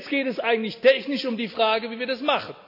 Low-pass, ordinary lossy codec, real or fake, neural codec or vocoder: 5.4 kHz; AAC, 48 kbps; real; none